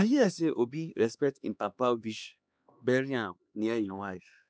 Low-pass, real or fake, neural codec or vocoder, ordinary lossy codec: none; fake; codec, 16 kHz, 2 kbps, X-Codec, HuBERT features, trained on LibriSpeech; none